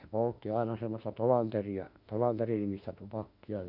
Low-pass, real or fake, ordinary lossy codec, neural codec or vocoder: 5.4 kHz; fake; none; autoencoder, 48 kHz, 32 numbers a frame, DAC-VAE, trained on Japanese speech